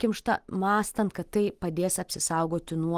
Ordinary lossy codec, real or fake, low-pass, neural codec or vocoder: Opus, 24 kbps; real; 14.4 kHz; none